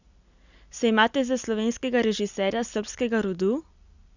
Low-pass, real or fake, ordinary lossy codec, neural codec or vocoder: 7.2 kHz; real; none; none